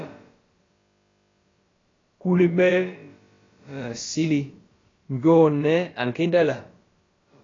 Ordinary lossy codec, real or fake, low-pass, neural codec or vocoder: AAC, 32 kbps; fake; 7.2 kHz; codec, 16 kHz, about 1 kbps, DyCAST, with the encoder's durations